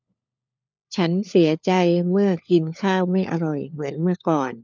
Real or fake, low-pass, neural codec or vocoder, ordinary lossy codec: fake; none; codec, 16 kHz, 4 kbps, FunCodec, trained on LibriTTS, 50 frames a second; none